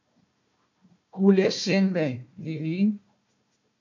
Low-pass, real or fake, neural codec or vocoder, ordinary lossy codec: 7.2 kHz; fake; codec, 16 kHz, 1 kbps, FunCodec, trained on Chinese and English, 50 frames a second; MP3, 64 kbps